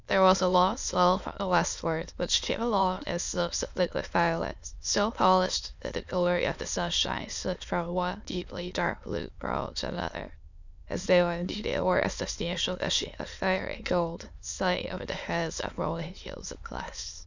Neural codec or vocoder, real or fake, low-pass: autoencoder, 22.05 kHz, a latent of 192 numbers a frame, VITS, trained on many speakers; fake; 7.2 kHz